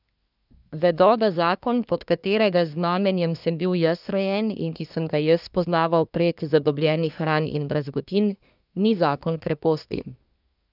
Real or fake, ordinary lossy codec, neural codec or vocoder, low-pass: fake; none; codec, 24 kHz, 1 kbps, SNAC; 5.4 kHz